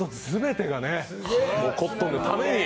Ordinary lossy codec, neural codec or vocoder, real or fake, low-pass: none; none; real; none